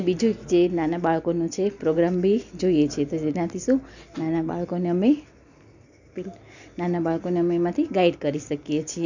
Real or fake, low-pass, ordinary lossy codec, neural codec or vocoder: real; 7.2 kHz; none; none